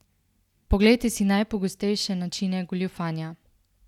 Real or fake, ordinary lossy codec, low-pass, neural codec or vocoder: real; none; 19.8 kHz; none